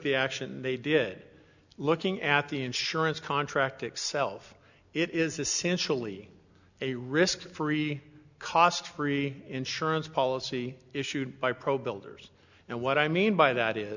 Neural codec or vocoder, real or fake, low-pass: none; real; 7.2 kHz